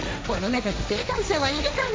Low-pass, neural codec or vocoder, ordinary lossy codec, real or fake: none; codec, 16 kHz, 1.1 kbps, Voila-Tokenizer; none; fake